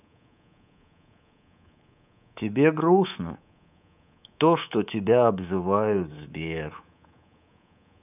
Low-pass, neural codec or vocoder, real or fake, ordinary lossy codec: 3.6 kHz; codec, 24 kHz, 3.1 kbps, DualCodec; fake; none